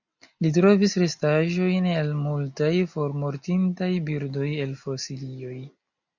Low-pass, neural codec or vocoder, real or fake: 7.2 kHz; none; real